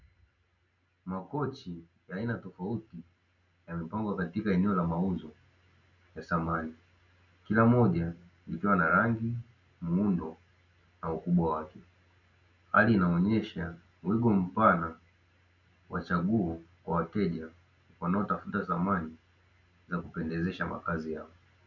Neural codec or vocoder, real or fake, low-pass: none; real; 7.2 kHz